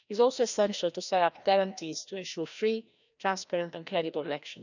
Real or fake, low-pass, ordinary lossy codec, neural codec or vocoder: fake; 7.2 kHz; none; codec, 16 kHz, 1 kbps, FreqCodec, larger model